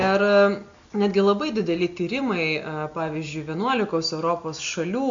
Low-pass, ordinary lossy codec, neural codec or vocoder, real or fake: 7.2 kHz; MP3, 64 kbps; none; real